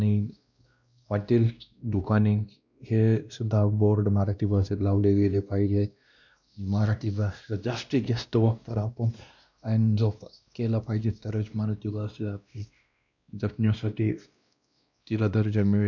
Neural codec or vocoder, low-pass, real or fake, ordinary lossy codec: codec, 16 kHz, 1 kbps, X-Codec, WavLM features, trained on Multilingual LibriSpeech; 7.2 kHz; fake; none